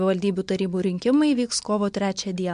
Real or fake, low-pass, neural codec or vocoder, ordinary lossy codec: real; 9.9 kHz; none; MP3, 64 kbps